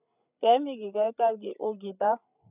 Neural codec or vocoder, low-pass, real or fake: codec, 16 kHz, 8 kbps, FreqCodec, larger model; 3.6 kHz; fake